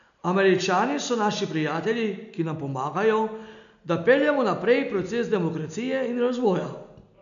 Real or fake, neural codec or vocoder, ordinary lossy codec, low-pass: real; none; none; 7.2 kHz